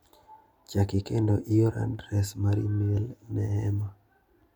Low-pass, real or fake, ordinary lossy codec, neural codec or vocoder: 19.8 kHz; real; none; none